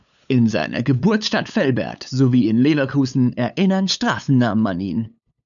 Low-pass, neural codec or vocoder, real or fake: 7.2 kHz; codec, 16 kHz, 8 kbps, FunCodec, trained on LibriTTS, 25 frames a second; fake